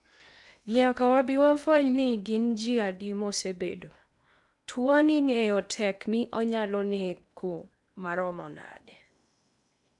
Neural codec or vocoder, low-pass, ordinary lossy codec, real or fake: codec, 16 kHz in and 24 kHz out, 0.8 kbps, FocalCodec, streaming, 65536 codes; 10.8 kHz; none; fake